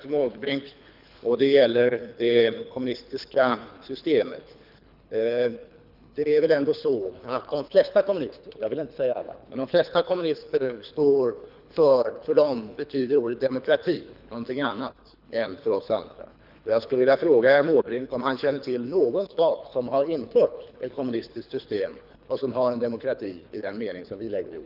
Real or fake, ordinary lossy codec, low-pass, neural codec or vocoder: fake; none; 5.4 kHz; codec, 24 kHz, 3 kbps, HILCodec